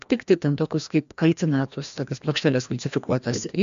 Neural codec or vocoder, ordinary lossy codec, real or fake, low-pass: codec, 16 kHz, 1 kbps, FreqCodec, larger model; MP3, 64 kbps; fake; 7.2 kHz